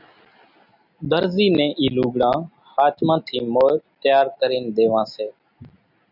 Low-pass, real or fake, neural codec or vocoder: 5.4 kHz; real; none